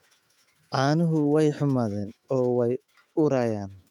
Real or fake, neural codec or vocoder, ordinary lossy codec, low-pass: fake; autoencoder, 48 kHz, 128 numbers a frame, DAC-VAE, trained on Japanese speech; MP3, 96 kbps; 19.8 kHz